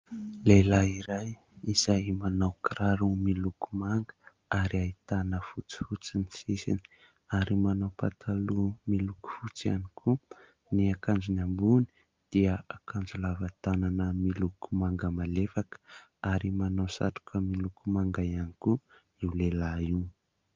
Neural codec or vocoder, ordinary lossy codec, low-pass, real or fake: none; Opus, 32 kbps; 7.2 kHz; real